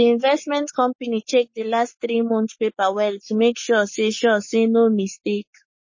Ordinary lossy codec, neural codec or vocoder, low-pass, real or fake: MP3, 32 kbps; codec, 44.1 kHz, 7.8 kbps, Pupu-Codec; 7.2 kHz; fake